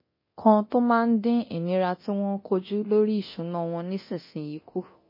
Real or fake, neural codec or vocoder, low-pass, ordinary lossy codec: fake; codec, 24 kHz, 0.9 kbps, DualCodec; 5.4 kHz; MP3, 24 kbps